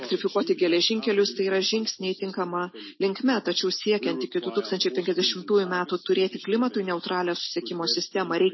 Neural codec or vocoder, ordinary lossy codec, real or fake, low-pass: none; MP3, 24 kbps; real; 7.2 kHz